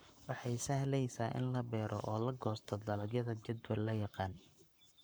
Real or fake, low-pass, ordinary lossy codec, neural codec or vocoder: fake; none; none; codec, 44.1 kHz, 7.8 kbps, Pupu-Codec